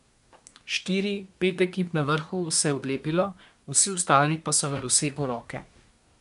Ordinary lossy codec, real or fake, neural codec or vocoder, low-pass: none; fake; codec, 24 kHz, 1 kbps, SNAC; 10.8 kHz